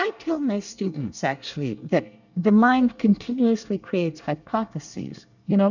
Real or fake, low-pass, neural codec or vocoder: fake; 7.2 kHz; codec, 24 kHz, 1 kbps, SNAC